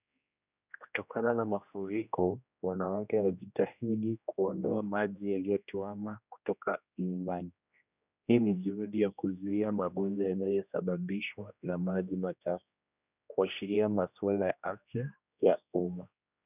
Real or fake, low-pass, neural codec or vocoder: fake; 3.6 kHz; codec, 16 kHz, 1 kbps, X-Codec, HuBERT features, trained on general audio